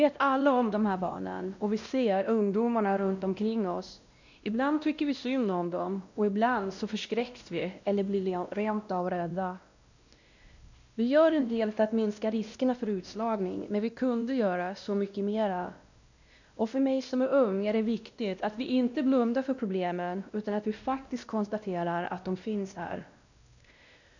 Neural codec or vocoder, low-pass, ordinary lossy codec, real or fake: codec, 16 kHz, 1 kbps, X-Codec, WavLM features, trained on Multilingual LibriSpeech; 7.2 kHz; none; fake